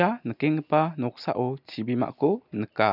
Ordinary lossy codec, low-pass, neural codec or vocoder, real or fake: none; 5.4 kHz; none; real